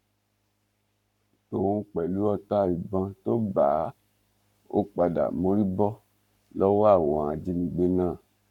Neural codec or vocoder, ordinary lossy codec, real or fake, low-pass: codec, 44.1 kHz, 7.8 kbps, Pupu-Codec; none; fake; 19.8 kHz